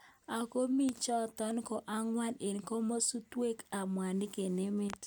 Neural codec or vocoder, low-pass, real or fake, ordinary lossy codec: none; none; real; none